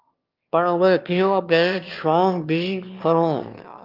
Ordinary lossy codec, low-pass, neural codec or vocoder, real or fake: Opus, 24 kbps; 5.4 kHz; autoencoder, 22.05 kHz, a latent of 192 numbers a frame, VITS, trained on one speaker; fake